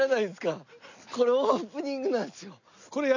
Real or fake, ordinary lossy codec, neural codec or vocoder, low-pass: real; none; none; 7.2 kHz